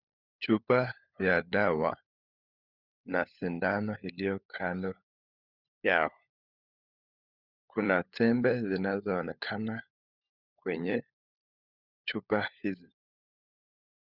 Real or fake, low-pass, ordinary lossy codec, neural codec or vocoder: fake; 5.4 kHz; Opus, 64 kbps; codec, 16 kHz, 16 kbps, FunCodec, trained on LibriTTS, 50 frames a second